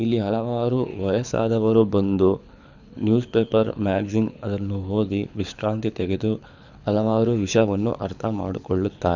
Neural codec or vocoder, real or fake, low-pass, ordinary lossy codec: codec, 24 kHz, 6 kbps, HILCodec; fake; 7.2 kHz; none